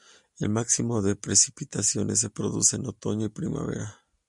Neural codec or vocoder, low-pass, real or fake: none; 10.8 kHz; real